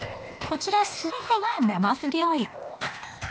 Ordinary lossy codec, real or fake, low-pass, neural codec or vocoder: none; fake; none; codec, 16 kHz, 0.8 kbps, ZipCodec